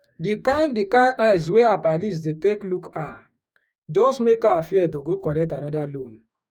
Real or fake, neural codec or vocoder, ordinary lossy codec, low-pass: fake; codec, 44.1 kHz, 2.6 kbps, DAC; none; 19.8 kHz